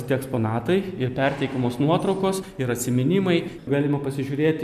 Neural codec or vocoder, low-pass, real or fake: none; 14.4 kHz; real